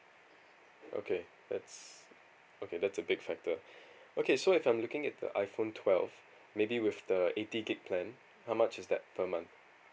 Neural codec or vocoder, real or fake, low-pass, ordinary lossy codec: none; real; none; none